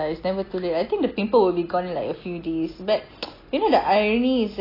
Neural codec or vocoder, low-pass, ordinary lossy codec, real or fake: none; 5.4 kHz; AAC, 24 kbps; real